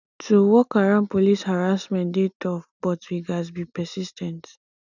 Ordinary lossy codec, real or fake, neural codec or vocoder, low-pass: none; real; none; 7.2 kHz